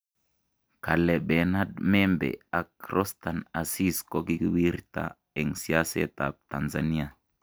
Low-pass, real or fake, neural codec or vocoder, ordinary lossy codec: none; real; none; none